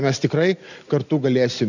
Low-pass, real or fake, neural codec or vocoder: 7.2 kHz; real; none